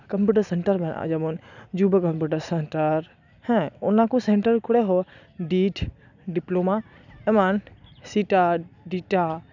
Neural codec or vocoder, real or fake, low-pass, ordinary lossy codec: none; real; 7.2 kHz; none